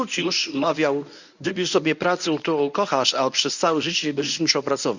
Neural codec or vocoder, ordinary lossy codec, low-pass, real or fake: codec, 24 kHz, 0.9 kbps, WavTokenizer, medium speech release version 1; none; 7.2 kHz; fake